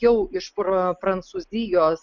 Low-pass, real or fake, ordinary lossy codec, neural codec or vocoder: 7.2 kHz; real; MP3, 64 kbps; none